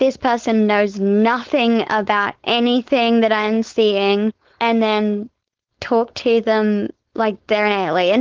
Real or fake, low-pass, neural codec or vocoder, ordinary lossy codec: fake; 7.2 kHz; codec, 16 kHz, 4.8 kbps, FACodec; Opus, 16 kbps